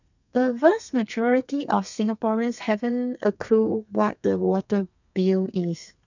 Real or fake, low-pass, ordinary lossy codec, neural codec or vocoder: fake; 7.2 kHz; none; codec, 32 kHz, 1.9 kbps, SNAC